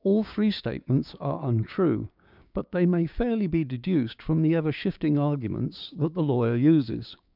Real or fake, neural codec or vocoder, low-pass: fake; codec, 16 kHz, 6 kbps, DAC; 5.4 kHz